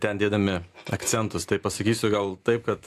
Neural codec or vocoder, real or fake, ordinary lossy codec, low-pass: none; real; AAC, 64 kbps; 14.4 kHz